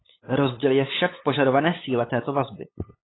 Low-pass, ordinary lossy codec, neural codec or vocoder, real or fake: 7.2 kHz; AAC, 16 kbps; codec, 16 kHz, 8 kbps, FunCodec, trained on LibriTTS, 25 frames a second; fake